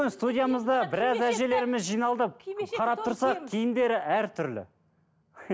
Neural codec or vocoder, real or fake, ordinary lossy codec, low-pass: none; real; none; none